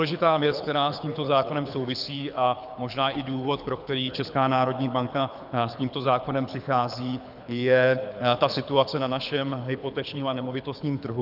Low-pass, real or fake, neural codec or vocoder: 5.4 kHz; fake; codec, 24 kHz, 6 kbps, HILCodec